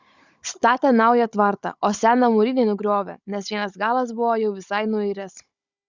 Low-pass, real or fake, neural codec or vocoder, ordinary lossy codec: 7.2 kHz; fake; codec, 16 kHz, 16 kbps, FunCodec, trained on Chinese and English, 50 frames a second; Opus, 64 kbps